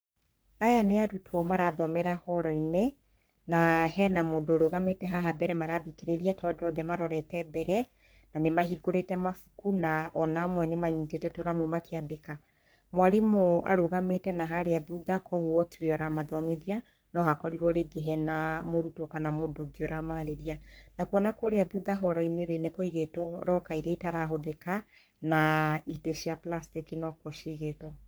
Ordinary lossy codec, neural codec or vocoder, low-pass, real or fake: none; codec, 44.1 kHz, 3.4 kbps, Pupu-Codec; none; fake